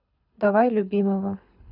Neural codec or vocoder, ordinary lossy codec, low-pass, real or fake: codec, 24 kHz, 6 kbps, HILCodec; none; 5.4 kHz; fake